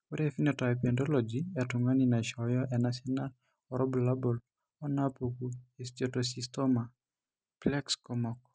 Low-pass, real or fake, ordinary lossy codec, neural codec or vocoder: none; real; none; none